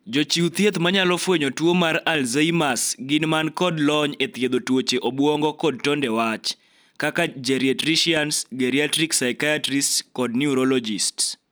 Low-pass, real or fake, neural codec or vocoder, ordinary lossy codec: none; real; none; none